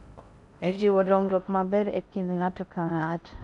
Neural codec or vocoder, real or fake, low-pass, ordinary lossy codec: codec, 16 kHz in and 24 kHz out, 0.6 kbps, FocalCodec, streaming, 4096 codes; fake; 10.8 kHz; none